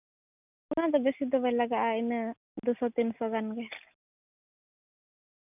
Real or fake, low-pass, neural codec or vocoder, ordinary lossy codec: real; 3.6 kHz; none; none